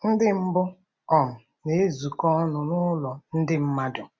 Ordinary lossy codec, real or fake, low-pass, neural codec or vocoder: none; real; none; none